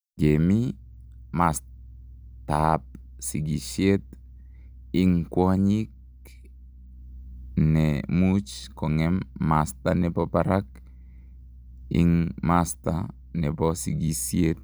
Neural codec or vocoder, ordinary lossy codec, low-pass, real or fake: none; none; none; real